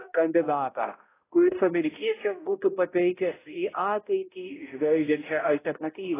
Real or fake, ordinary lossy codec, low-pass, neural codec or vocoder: fake; AAC, 16 kbps; 3.6 kHz; codec, 16 kHz, 0.5 kbps, X-Codec, HuBERT features, trained on balanced general audio